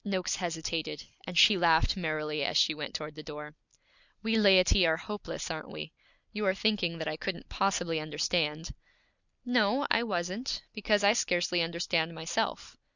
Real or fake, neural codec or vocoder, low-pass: real; none; 7.2 kHz